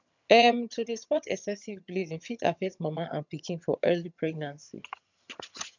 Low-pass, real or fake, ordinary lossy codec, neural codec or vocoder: 7.2 kHz; fake; none; vocoder, 22.05 kHz, 80 mel bands, HiFi-GAN